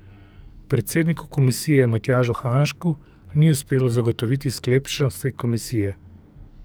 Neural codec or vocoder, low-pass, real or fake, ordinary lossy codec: codec, 44.1 kHz, 2.6 kbps, SNAC; none; fake; none